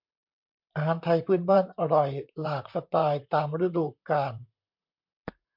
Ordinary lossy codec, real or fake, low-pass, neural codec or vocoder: MP3, 48 kbps; fake; 5.4 kHz; vocoder, 44.1 kHz, 128 mel bands, Pupu-Vocoder